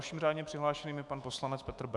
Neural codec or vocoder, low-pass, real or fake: autoencoder, 48 kHz, 128 numbers a frame, DAC-VAE, trained on Japanese speech; 10.8 kHz; fake